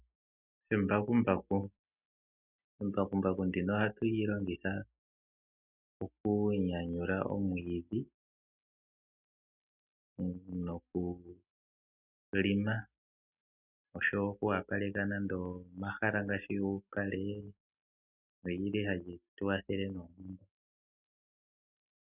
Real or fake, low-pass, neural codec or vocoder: real; 3.6 kHz; none